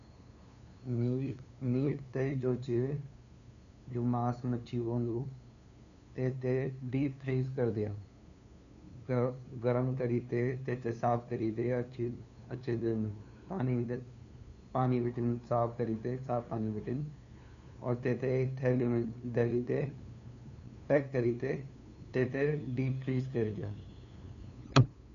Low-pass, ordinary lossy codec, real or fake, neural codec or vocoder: 7.2 kHz; none; fake; codec, 16 kHz, 2 kbps, FunCodec, trained on LibriTTS, 25 frames a second